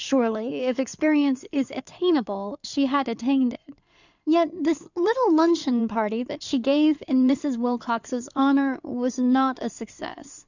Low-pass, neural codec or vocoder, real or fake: 7.2 kHz; codec, 16 kHz in and 24 kHz out, 2.2 kbps, FireRedTTS-2 codec; fake